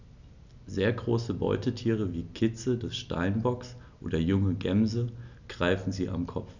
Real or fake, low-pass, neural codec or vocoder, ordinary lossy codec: real; 7.2 kHz; none; none